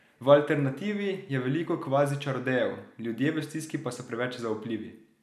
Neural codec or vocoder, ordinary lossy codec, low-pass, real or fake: none; none; 14.4 kHz; real